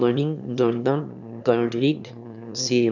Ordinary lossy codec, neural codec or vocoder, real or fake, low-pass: none; autoencoder, 22.05 kHz, a latent of 192 numbers a frame, VITS, trained on one speaker; fake; 7.2 kHz